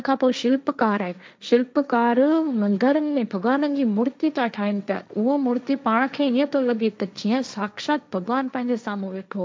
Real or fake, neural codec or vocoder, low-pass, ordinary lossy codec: fake; codec, 16 kHz, 1.1 kbps, Voila-Tokenizer; none; none